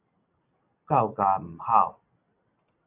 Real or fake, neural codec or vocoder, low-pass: real; none; 3.6 kHz